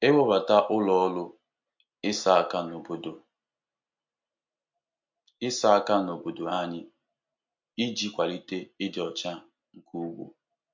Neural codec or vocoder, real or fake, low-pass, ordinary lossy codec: none; real; 7.2 kHz; MP3, 48 kbps